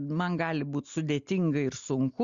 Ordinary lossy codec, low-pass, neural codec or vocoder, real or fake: Opus, 64 kbps; 7.2 kHz; none; real